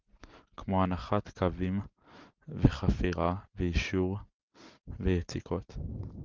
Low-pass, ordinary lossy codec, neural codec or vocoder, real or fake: 7.2 kHz; Opus, 24 kbps; none; real